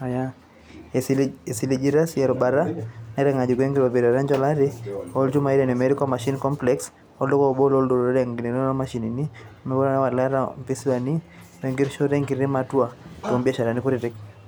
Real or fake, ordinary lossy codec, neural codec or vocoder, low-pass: real; none; none; none